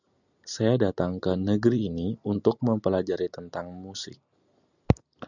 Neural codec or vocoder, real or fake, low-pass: none; real; 7.2 kHz